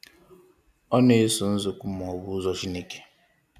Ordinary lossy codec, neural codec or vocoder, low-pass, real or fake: none; none; 14.4 kHz; real